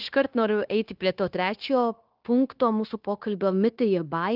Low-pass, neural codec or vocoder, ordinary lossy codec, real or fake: 5.4 kHz; codec, 16 kHz, 0.9 kbps, LongCat-Audio-Codec; Opus, 32 kbps; fake